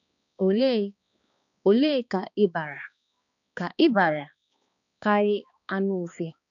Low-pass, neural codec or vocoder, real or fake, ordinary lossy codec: 7.2 kHz; codec, 16 kHz, 2 kbps, X-Codec, HuBERT features, trained on balanced general audio; fake; none